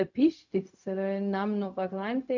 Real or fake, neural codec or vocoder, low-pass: fake; codec, 16 kHz, 0.4 kbps, LongCat-Audio-Codec; 7.2 kHz